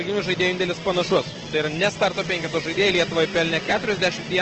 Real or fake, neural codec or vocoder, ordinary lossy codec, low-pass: real; none; Opus, 16 kbps; 7.2 kHz